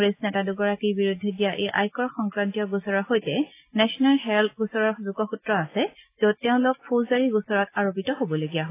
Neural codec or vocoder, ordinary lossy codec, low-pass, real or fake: none; AAC, 24 kbps; 3.6 kHz; real